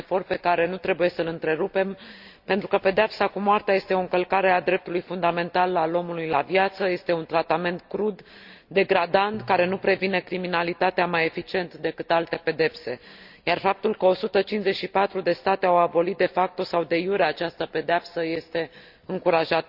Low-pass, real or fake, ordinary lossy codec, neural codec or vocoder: 5.4 kHz; real; Opus, 64 kbps; none